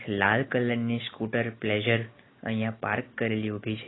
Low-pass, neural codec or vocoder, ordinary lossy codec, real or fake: 7.2 kHz; none; AAC, 16 kbps; real